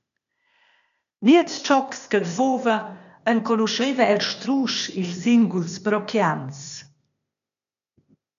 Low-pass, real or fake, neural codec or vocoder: 7.2 kHz; fake; codec, 16 kHz, 0.8 kbps, ZipCodec